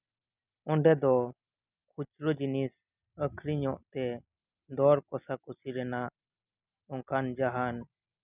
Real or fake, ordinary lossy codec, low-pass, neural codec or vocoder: real; none; 3.6 kHz; none